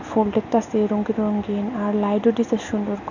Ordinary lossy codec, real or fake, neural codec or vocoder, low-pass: none; real; none; 7.2 kHz